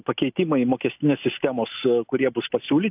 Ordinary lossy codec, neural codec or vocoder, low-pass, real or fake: AAC, 32 kbps; none; 3.6 kHz; real